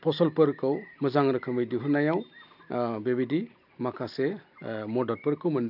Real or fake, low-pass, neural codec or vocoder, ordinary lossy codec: real; 5.4 kHz; none; none